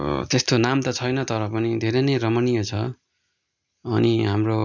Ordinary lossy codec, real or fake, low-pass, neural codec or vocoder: none; real; 7.2 kHz; none